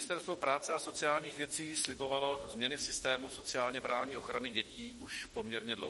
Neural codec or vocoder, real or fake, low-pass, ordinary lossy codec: autoencoder, 48 kHz, 32 numbers a frame, DAC-VAE, trained on Japanese speech; fake; 19.8 kHz; MP3, 48 kbps